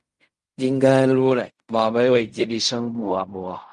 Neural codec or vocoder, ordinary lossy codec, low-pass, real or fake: codec, 16 kHz in and 24 kHz out, 0.4 kbps, LongCat-Audio-Codec, fine tuned four codebook decoder; Opus, 32 kbps; 10.8 kHz; fake